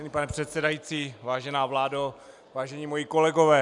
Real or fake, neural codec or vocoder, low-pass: real; none; 10.8 kHz